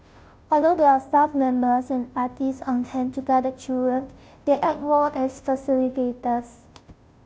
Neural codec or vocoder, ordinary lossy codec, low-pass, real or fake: codec, 16 kHz, 0.5 kbps, FunCodec, trained on Chinese and English, 25 frames a second; none; none; fake